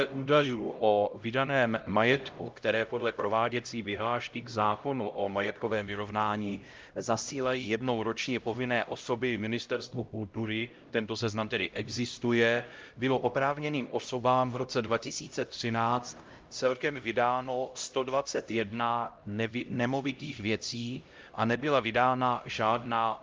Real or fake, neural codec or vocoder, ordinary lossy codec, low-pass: fake; codec, 16 kHz, 0.5 kbps, X-Codec, HuBERT features, trained on LibriSpeech; Opus, 24 kbps; 7.2 kHz